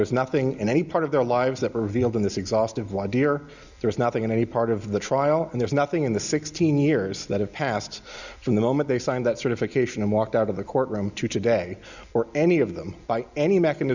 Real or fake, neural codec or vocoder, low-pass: real; none; 7.2 kHz